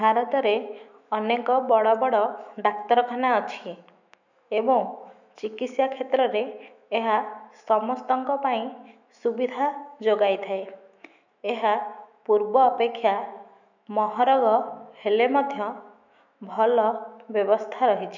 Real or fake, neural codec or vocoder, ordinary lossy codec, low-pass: fake; autoencoder, 48 kHz, 128 numbers a frame, DAC-VAE, trained on Japanese speech; none; 7.2 kHz